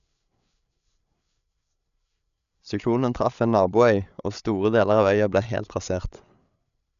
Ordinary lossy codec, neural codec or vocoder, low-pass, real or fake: none; codec, 16 kHz, 8 kbps, FreqCodec, larger model; 7.2 kHz; fake